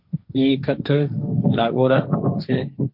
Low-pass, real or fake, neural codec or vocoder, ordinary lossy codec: 5.4 kHz; fake; codec, 16 kHz, 1.1 kbps, Voila-Tokenizer; MP3, 48 kbps